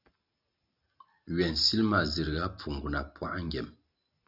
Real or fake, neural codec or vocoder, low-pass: real; none; 5.4 kHz